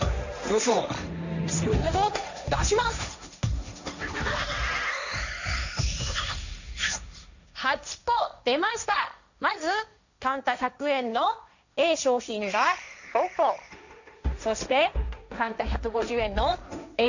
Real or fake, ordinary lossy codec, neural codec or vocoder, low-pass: fake; none; codec, 16 kHz, 1.1 kbps, Voila-Tokenizer; 7.2 kHz